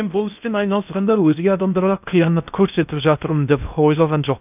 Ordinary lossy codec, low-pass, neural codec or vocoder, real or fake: none; 3.6 kHz; codec, 16 kHz in and 24 kHz out, 0.6 kbps, FocalCodec, streaming, 2048 codes; fake